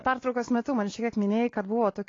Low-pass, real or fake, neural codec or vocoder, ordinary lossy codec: 7.2 kHz; real; none; AAC, 32 kbps